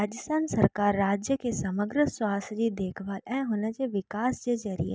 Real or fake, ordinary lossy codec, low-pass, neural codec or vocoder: real; none; none; none